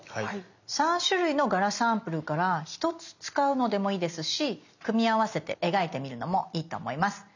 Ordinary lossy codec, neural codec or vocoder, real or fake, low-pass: none; none; real; 7.2 kHz